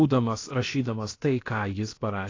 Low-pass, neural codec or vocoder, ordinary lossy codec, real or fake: 7.2 kHz; codec, 16 kHz, about 1 kbps, DyCAST, with the encoder's durations; AAC, 32 kbps; fake